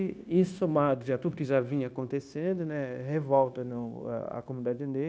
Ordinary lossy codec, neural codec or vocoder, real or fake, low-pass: none; codec, 16 kHz, 0.9 kbps, LongCat-Audio-Codec; fake; none